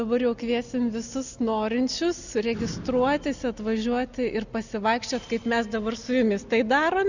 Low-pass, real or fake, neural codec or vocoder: 7.2 kHz; real; none